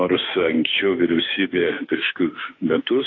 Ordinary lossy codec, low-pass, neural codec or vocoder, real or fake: AAC, 32 kbps; 7.2 kHz; autoencoder, 48 kHz, 32 numbers a frame, DAC-VAE, trained on Japanese speech; fake